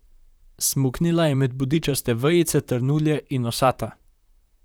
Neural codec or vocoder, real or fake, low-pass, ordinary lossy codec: vocoder, 44.1 kHz, 128 mel bands, Pupu-Vocoder; fake; none; none